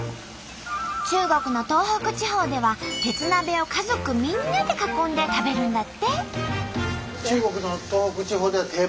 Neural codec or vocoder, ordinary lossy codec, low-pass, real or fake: none; none; none; real